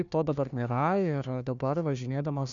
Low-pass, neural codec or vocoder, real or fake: 7.2 kHz; codec, 16 kHz, 1 kbps, FunCodec, trained on Chinese and English, 50 frames a second; fake